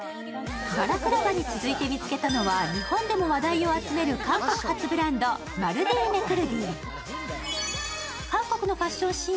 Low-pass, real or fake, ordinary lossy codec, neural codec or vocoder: none; real; none; none